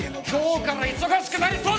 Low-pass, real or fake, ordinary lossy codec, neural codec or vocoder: none; real; none; none